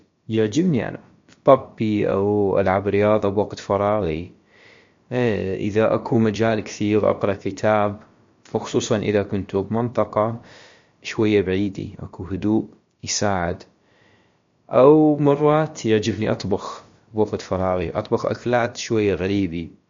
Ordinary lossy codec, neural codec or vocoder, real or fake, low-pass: MP3, 48 kbps; codec, 16 kHz, about 1 kbps, DyCAST, with the encoder's durations; fake; 7.2 kHz